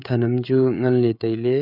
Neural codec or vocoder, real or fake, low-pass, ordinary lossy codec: codec, 16 kHz, 16 kbps, FreqCodec, smaller model; fake; 5.4 kHz; none